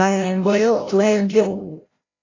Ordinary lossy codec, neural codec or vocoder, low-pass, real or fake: AAC, 32 kbps; codec, 16 kHz, 0.5 kbps, FreqCodec, larger model; 7.2 kHz; fake